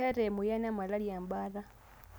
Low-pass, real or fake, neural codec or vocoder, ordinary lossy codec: none; real; none; none